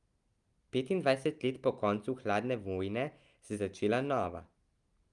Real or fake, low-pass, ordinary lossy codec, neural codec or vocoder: real; 10.8 kHz; Opus, 32 kbps; none